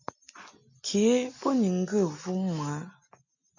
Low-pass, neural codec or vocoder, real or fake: 7.2 kHz; none; real